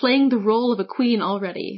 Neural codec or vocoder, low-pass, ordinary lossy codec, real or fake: none; 7.2 kHz; MP3, 24 kbps; real